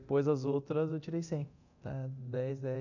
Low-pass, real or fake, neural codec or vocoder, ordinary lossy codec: 7.2 kHz; fake; codec, 24 kHz, 0.9 kbps, DualCodec; none